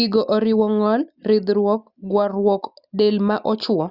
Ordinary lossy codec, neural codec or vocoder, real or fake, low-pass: Opus, 64 kbps; none; real; 5.4 kHz